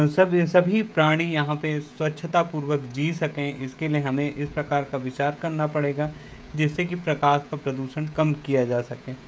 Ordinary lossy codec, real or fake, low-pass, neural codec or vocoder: none; fake; none; codec, 16 kHz, 16 kbps, FreqCodec, smaller model